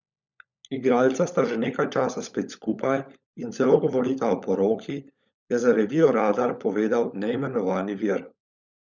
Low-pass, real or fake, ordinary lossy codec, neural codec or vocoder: 7.2 kHz; fake; none; codec, 16 kHz, 16 kbps, FunCodec, trained on LibriTTS, 50 frames a second